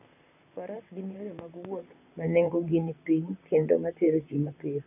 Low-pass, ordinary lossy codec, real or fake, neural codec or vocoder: 3.6 kHz; none; fake; vocoder, 44.1 kHz, 128 mel bands, Pupu-Vocoder